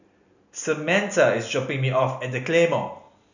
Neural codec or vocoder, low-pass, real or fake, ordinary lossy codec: none; 7.2 kHz; real; none